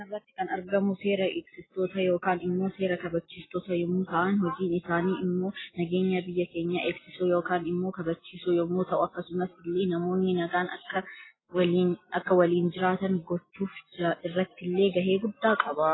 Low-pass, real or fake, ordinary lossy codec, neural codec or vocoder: 7.2 kHz; real; AAC, 16 kbps; none